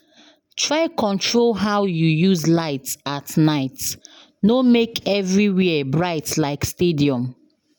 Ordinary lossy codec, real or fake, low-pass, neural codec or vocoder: none; real; none; none